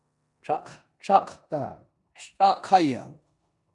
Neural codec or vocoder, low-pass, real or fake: codec, 16 kHz in and 24 kHz out, 0.9 kbps, LongCat-Audio-Codec, four codebook decoder; 10.8 kHz; fake